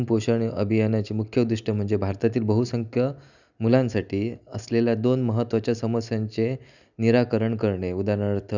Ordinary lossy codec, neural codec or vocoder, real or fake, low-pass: none; none; real; 7.2 kHz